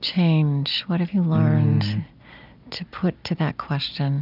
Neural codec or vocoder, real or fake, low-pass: none; real; 5.4 kHz